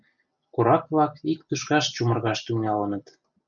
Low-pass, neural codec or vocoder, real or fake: 7.2 kHz; none; real